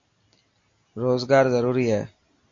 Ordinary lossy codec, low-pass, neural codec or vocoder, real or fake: AAC, 48 kbps; 7.2 kHz; none; real